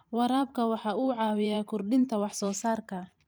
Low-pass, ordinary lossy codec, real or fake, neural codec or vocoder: none; none; fake; vocoder, 44.1 kHz, 128 mel bands every 512 samples, BigVGAN v2